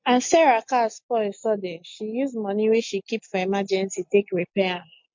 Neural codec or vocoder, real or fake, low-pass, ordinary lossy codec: none; real; 7.2 kHz; MP3, 48 kbps